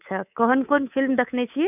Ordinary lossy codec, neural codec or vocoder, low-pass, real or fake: none; codec, 24 kHz, 3.1 kbps, DualCodec; 3.6 kHz; fake